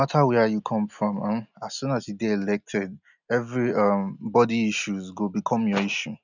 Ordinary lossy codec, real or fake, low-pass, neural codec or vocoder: none; real; 7.2 kHz; none